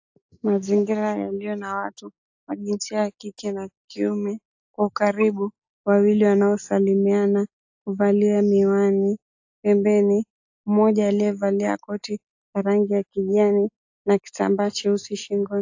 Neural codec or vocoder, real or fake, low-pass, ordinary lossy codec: none; real; 7.2 kHz; AAC, 48 kbps